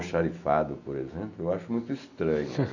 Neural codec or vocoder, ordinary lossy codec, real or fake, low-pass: none; none; real; 7.2 kHz